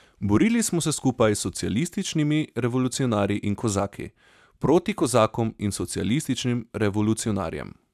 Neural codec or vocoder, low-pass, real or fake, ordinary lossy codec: none; 14.4 kHz; real; none